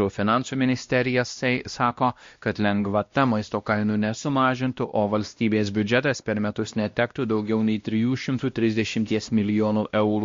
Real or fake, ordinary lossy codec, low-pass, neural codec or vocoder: fake; MP3, 48 kbps; 7.2 kHz; codec, 16 kHz, 1 kbps, X-Codec, WavLM features, trained on Multilingual LibriSpeech